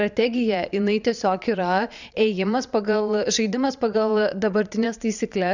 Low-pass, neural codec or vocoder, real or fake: 7.2 kHz; vocoder, 22.05 kHz, 80 mel bands, Vocos; fake